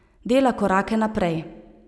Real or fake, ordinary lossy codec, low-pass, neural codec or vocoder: real; none; none; none